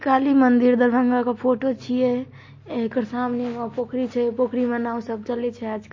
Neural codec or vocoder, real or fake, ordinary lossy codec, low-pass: none; real; MP3, 32 kbps; 7.2 kHz